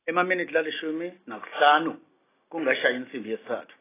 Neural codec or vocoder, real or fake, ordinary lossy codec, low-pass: none; real; AAC, 16 kbps; 3.6 kHz